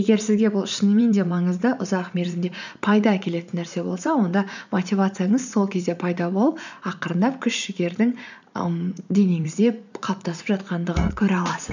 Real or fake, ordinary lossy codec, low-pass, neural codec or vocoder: fake; none; 7.2 kHz; vocoder, 44.1 kHz, 80 mel bands, Vocos